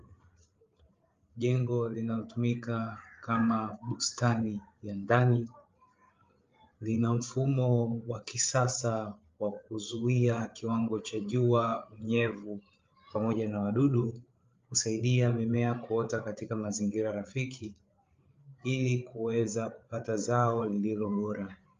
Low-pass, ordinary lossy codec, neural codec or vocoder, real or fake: 7.2 kHz; Opus, 32 kbps; codec, 16 kHz, 8 kbps, FreqCodec, larger model; fake